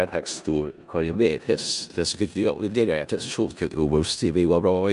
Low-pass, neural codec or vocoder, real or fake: 10.8 kHz; codec, 16 kHz in and 24 kHz out, 0.4 kbps, LongCat-Audio-Codec, four codebook decoder; fake